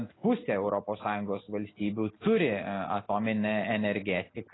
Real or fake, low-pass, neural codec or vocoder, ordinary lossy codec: real; 7.2 kHz; none; AAC, 16 kbps